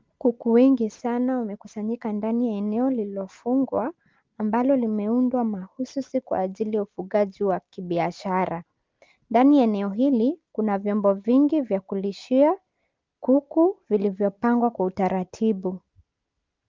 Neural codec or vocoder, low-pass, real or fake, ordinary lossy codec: none; 7.2 kHz; real; Opus, 32 kbps